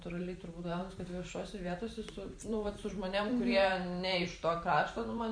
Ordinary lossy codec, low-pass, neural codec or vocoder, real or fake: Opus, 64 kbps; 9.9 kHz; vocoder, 44.1 kHz, 128 mel bands every 512 samples, BigVGAN v2; fake